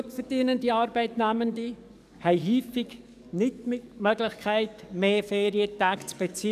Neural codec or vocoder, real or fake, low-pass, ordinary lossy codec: codec, 44.1 kHz, 7.8 kbps, DAC; fake; 14.4 kHz; none